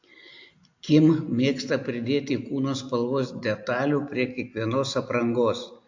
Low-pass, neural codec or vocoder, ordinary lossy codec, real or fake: 7.2 kHz; none; AAC, 48 kbps; real